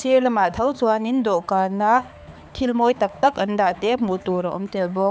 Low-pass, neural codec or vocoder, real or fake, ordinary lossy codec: none; codec, 16 kHz, 4 kbps, X-Codec, HuBERT features, trained on balanced general audio; fake; none